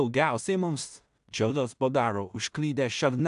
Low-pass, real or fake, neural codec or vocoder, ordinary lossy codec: 10.8 kHz; fake; codec, 16 kHz in and 24 kHz out, 0.4 kbps, LongCat-Audio-Codec, two codebook decoder; AAC, 96 kbps